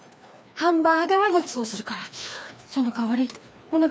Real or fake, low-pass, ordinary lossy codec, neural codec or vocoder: fake; none; none; codec, 16 kHz, 2 kbps, FreqCodec, larger model